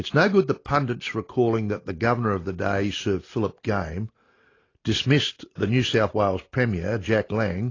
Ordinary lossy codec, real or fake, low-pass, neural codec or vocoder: AAC, 32 kbps; real; 7.2 kHz; none